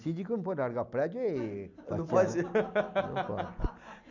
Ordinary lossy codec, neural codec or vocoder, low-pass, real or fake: none; none; 7.2 kHz; real